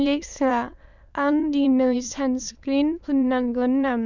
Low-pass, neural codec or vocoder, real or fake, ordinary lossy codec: 7.2 kHz; autoencoder, 22.05 kHz, a latent of 192 numbers a frame, VITS, trained on many speakers; fake; none